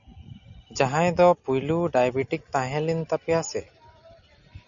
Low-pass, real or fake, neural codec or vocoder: 7.2 kHz; real; none